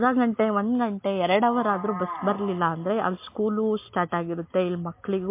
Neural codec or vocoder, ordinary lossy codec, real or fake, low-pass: none; MP3, 24 kbps; real; 3.6 kHz